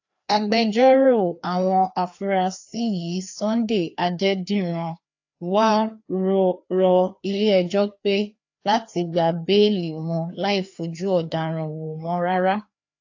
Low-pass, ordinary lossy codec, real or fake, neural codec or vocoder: 7.2 kHz; AAC, 48 kbps; fake; codec, 16 kHz, 2 kbps, FreqCodec, larger model